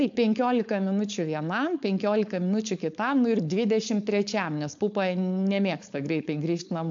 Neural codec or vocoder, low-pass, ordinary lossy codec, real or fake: codec, 16 kHz, 4.8 kbps, FACodec; 7.2 kHz; AAC, 64 kbps; fake